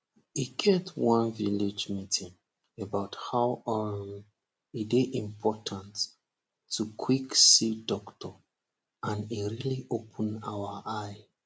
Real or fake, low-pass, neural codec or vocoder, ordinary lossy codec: real; none; none; none